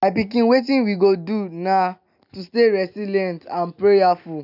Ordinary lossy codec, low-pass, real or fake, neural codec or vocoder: none; 5.4 kHz; real; none